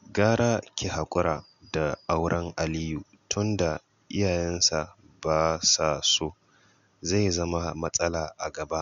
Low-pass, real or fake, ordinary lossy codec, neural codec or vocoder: 7.2 kHz; real; none; none